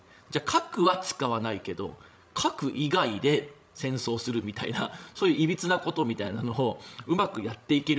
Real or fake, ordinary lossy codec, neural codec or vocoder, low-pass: fake; none; codec, 16 kHz, 16 kbps, FreqCodec, larger model; none